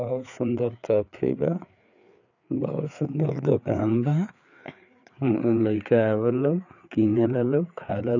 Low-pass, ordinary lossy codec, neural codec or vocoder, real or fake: 7.2 kHz; none; codec, 16 kHz, 4 kbps, FreqCodec, larger model; fake